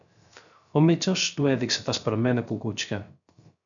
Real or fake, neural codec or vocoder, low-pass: fake; codec, 16 kHz, 0.3 kbps, FocalCodec; 7.2 kHz